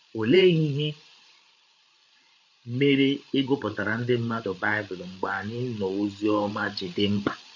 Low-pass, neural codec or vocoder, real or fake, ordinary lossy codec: 7.2 kHz; codec, 16 kHz, 8 kbps, FreqCodec, larger model; fake; none